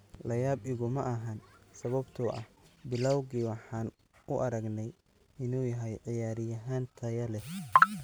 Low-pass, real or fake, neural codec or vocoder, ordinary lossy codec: none; real; none; none